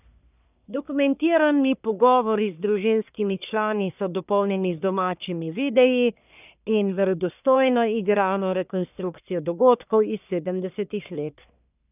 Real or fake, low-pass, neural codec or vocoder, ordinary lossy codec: fake; 3.6 kHz; codec, 44.1 kHz, 1.7 kbps, Pupu-Codec; none